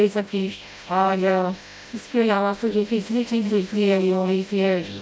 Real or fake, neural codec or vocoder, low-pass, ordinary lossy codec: fake; codec, 16 kHz, 0.5 kbps, FreqCodec, smaller model; none; none